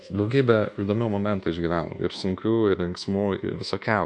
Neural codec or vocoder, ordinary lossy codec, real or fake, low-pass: codec, 24 kHz, 1.2 kbps, DualCodec; MP3, 64 kbps; fake; 10.8 kHz